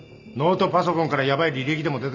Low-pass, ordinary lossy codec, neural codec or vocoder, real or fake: 7.2 kHz; none; none; real